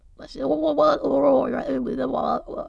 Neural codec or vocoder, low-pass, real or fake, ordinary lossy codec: autoencoder, 22.05 kHz, a latent of 192 numbers a frame, VITS, trained on many speakers; none; fake; none